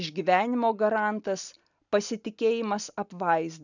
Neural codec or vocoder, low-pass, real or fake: none; 7.2 kHz; real